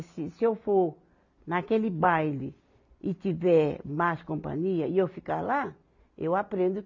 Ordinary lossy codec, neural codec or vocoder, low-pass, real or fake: none; none; 7.2 kHz; real